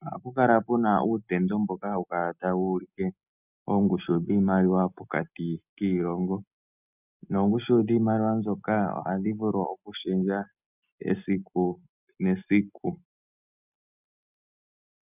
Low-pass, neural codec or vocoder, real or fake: 3.6 kHz; none; real